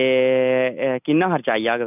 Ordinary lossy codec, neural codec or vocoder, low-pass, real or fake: none; none; 3.6 kHz; real